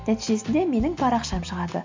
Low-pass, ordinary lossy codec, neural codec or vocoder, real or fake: 7.2 kHz; MP3, 64 kbps; none; real